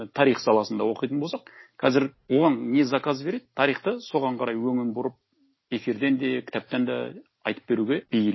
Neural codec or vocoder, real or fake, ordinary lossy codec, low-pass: none; real; MP3, 24 kbps; 7.2 kHz